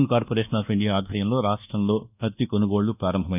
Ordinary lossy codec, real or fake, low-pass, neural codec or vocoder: none; fake; 3.6 kHz; codec, 24 kHz, 1.2 kbps, DualCodec